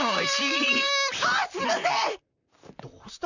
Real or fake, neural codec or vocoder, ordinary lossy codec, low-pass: fake; vocoder, 44.1 kHz, 128 mel bands, Pupu-Vocoder; none; 7.2 kHz